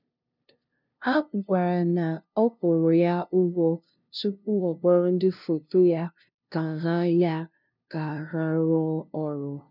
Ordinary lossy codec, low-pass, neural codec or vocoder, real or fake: none; 5.4 kHz; codec, 16 kHz, 0.5 kbps, FunCodec, trained on LibriTTS, 25 frames a second; fake